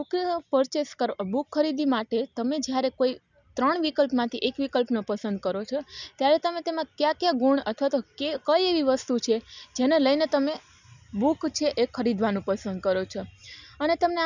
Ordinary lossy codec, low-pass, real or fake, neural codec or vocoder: none; 7.2 kHz; real; none